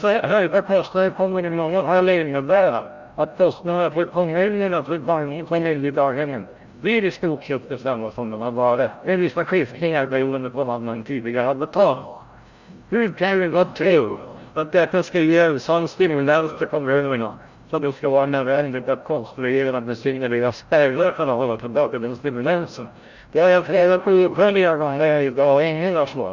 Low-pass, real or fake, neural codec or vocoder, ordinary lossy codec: 7.2 kHz; fake; codec, 16 kHz, 0.5 kbps, FreqCodec, larger model; Opus, 64 kbps